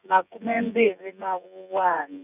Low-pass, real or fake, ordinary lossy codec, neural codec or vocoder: 3.6 kHz; fake; none; vocoder, 24 kHz, 100 mel bands, Vocos